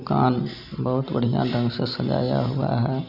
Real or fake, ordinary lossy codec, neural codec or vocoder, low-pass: real; none; none; 5.4 kHz